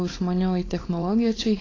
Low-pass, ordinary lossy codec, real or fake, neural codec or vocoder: 7.2 kHz; AAC, 32 kbps; fake; codec, 16 kHz, 4.8 kbps, FACodec